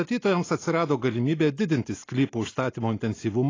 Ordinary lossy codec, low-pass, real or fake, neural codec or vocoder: AAC, 32 kbps; 7.2 kHz; real; none